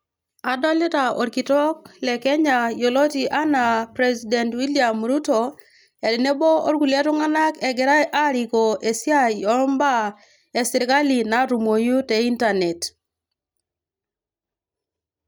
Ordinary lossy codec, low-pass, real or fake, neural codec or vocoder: none; none; real; none